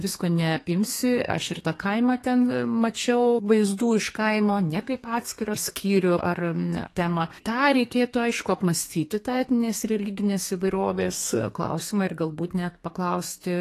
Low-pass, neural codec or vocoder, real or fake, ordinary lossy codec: 14.4 kHz; codec, 32 kHz, 1.9 kbps, SNAC; fake; AAC, 48 kbps